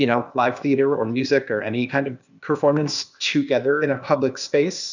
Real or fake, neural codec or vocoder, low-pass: fake; codec, 16 kHz, 0.8 kbps, ZipCodec; 7.2 kHz